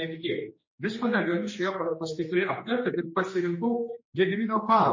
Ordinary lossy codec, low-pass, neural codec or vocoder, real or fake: MP3, 32 kbps; 7.2 kHz; codec, 16 kHz, 2 kbps, X-Codec, HuBERT features, trained on general audio; fake